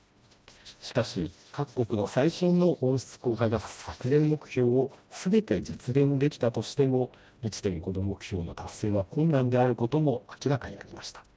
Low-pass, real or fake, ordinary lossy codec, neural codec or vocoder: none; fake; none; codec, 16 kHz, 1 kbps, FreqCodec, smaller model